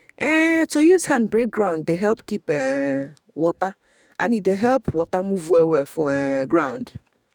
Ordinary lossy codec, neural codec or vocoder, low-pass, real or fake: none; codec, 44.1 kHz, 2.6 kbps, DAC; 19.8 kHz; fake